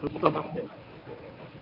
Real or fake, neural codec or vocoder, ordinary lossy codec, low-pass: fake; codec, 24 kHz, 0.9 kbps, WavTokenizer, medium speech release version 1; AAC, 48 kbps; 5.4 kHz